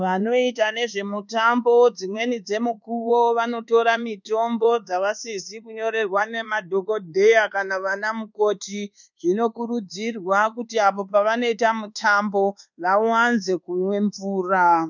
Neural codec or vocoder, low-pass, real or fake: codec, 24 kHz, 1.2 kbps, DualCodec; 7.2 kHz; fake